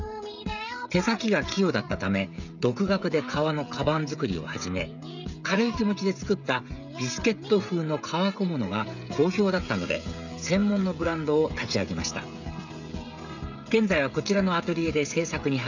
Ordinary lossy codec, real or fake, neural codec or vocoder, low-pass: none; fake; codec, 16 kHz, 16 kbps, FreqCodec, smaller model; 7.2 kHz